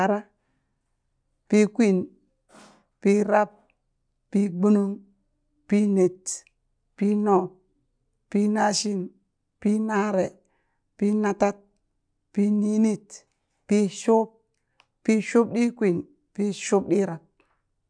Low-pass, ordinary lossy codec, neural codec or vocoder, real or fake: 9.9 kHz; none; none; real